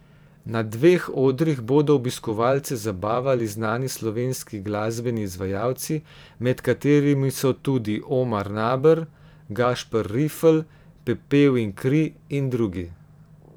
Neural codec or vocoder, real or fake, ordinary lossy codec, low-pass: vocoder, 44.1 kHz, 128 mel bands every 512 samples, BigVGAN v2; fake; none; none